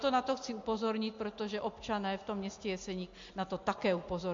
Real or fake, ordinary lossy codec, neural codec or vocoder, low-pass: real; MP3, 48 kbps; none; 7.2 kHz